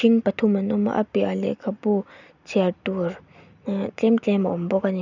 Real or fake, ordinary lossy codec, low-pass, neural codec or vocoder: real; none; 7.2 kHz; none